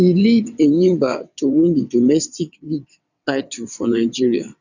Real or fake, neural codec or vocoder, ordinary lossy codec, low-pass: fake; vocoder, 22.05 kHz, 80 mel bands, WaveNeXt; none; 7.2 kHz